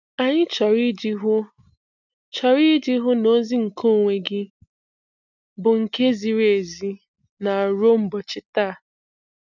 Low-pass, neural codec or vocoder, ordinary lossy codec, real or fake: 7.2 kHz; none; none; real